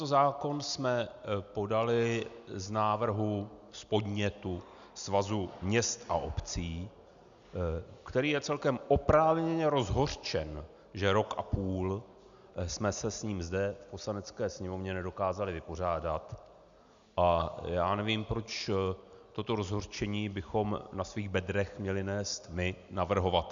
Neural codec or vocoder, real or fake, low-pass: none; real; 7.2 kHz